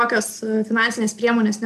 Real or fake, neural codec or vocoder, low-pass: real; none; 14.4 kHz